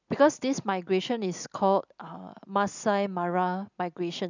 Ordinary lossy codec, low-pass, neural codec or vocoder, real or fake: none; 7.2 kHz; none; real